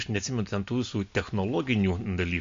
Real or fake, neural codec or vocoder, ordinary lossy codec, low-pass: real; none; MP3, 48 kbps; 7.2 kHz